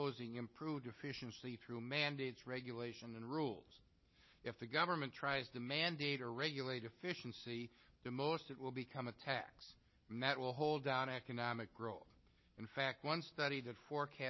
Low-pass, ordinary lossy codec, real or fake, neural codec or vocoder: 7.2 kHz; MP3, 24 kbps; real; none